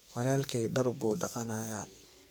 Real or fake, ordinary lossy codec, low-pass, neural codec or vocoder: fake; none; none; codec, 44.1 kHz, 2.6 kbps, SNAC